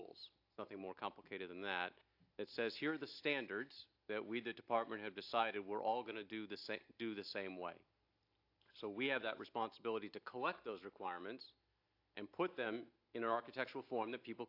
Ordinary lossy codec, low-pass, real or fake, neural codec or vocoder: AAC, 48 kbps; 5.4 kHz; real; none